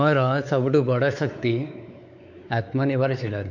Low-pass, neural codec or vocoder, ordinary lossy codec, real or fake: 7.2 kHz; codec, 16 kHz, 4 kbps, X-Codec, WavLM features, trained on Multilingual LibriSpeech; none; fake